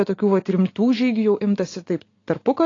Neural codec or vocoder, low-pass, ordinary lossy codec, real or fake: none; 7.2 kHz; AAC, 32 kbps; real